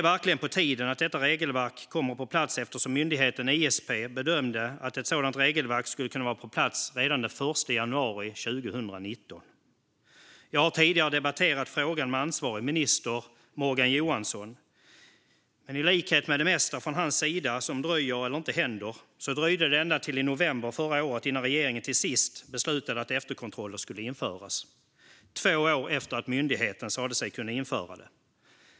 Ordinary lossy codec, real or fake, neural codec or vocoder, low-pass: none; real; none; none